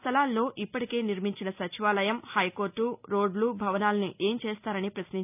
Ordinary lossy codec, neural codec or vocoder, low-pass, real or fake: none; none; 3.6 kHz; real